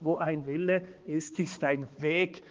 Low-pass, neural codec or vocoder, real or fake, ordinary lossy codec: 7.2 kHz; codec, 16 kHz, 2 kbps, X-Codec, HuBERT features, trained on balanced general audio; fake; Opus, 32 kbps